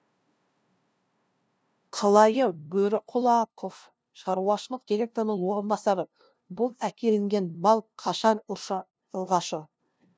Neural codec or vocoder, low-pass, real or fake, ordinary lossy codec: codec, 16 kHz, 0.5 kbps, FunCodec, trained on LibriTTS, 25 frames a second; none; fake; none